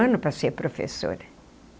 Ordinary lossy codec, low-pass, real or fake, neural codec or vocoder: none; none; real; none